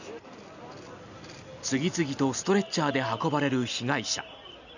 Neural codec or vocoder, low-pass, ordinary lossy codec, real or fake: none; 7.2 kHz; none; real